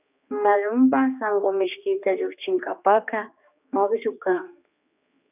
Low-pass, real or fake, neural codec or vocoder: 3.6 kHz; fake; codec, 16 kHz, 2 kbps, X-Codec, HuBERT features, trained on general audio